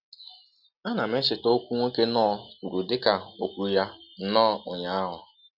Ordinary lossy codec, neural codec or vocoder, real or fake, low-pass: none; none; real; 5.4 kHz